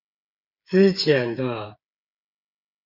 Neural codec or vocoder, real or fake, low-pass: codec, 16 kHz, 8 kbps, FreqCodec, smaller model; fake; 5.4 kHz